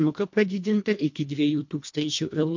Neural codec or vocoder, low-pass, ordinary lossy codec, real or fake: codec, 24 kHz, 1.5 kbps, HILCodec; 7.2 kHz; MP3, 48 kbps; fake